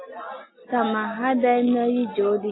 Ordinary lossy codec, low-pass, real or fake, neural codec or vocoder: AAC, 16 kbps; 7.2 kHz; real; none